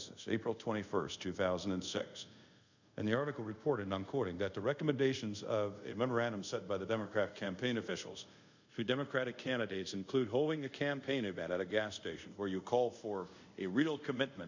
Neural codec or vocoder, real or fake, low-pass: codec, 24 kHz, 0.5 kbps, DualCodec; fake; 7.2 kHz